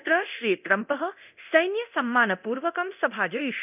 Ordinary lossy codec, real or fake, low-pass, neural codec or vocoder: none; fake; 3.6 kHz; codec, 24 kHz, 0.9 kbps, DualCodec